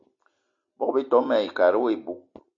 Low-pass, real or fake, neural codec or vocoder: 7.2 kHz; real; none